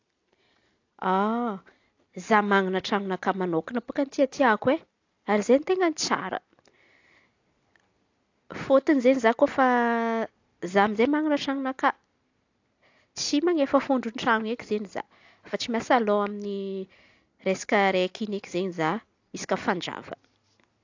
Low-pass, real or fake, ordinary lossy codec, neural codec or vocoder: 7.2 kHz; real; AAC, 48 kbps; none